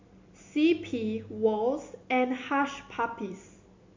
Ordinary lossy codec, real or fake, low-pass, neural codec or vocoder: MP3, 48 kbps; real; 7.2 kHz; none